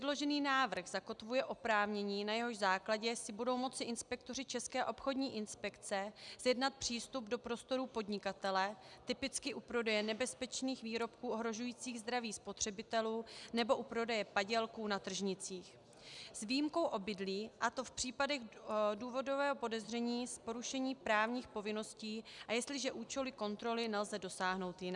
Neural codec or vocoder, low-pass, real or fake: none; 10.8 kHz; real